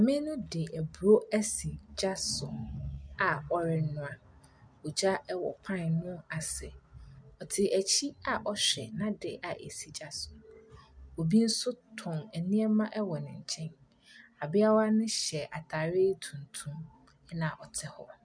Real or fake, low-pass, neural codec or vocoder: real; 9.9 kHz; none